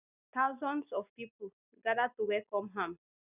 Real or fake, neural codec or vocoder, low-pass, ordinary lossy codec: real; none; 3.6 kHz; none